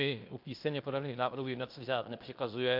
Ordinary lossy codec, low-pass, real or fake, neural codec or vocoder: MP3, 48 kbps; 5.4 kHz; fake; codec, 16 kHz in and 24 kHz out, 0.9 kbps, LongCat-Audio-Codec, fine tuned four codebook decoder